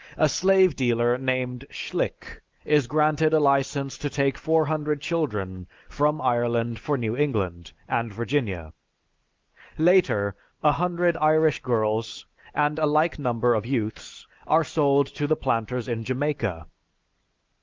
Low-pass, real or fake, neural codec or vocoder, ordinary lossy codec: 7.2 kHz; real; none; Opus, 32 kbps